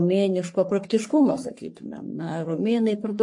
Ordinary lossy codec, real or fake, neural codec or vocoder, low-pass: MP3, 48 kbps; fake; codec, 44.1 kHz, 3.4 kbps, Pupu-Codec; 10.8 kHz